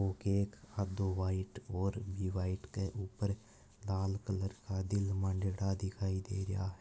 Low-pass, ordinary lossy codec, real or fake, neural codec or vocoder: none; none; real; none